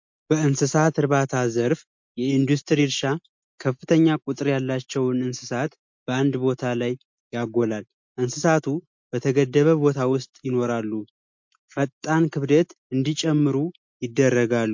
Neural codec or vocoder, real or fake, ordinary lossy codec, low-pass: none; real; MP3, 48 kbps; 7.2 kHz